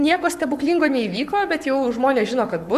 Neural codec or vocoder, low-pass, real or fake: codec, 44.1 kHz, 7.8 kbps, Pupu-Codec; 14.4 kHz; fake